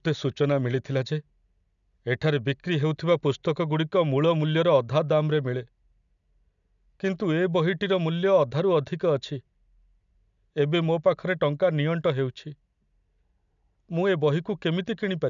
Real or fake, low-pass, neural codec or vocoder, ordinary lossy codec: real; 7.2 kHz; none; none